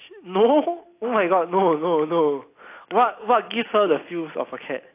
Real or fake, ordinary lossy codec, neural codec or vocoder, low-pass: real; AAC, 24 kbps; none; 3.6 kHz